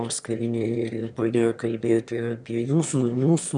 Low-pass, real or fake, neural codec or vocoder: 9.9 kHz; fake; autoencoder, 22.05 kHz, a latent of 192 numbers a frame, VITS, trained on one speaker